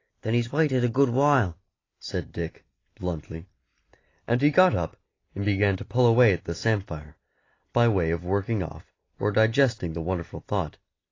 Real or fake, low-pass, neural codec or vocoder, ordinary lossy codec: real; 7.2 kHz; none; AAC, 32 kbps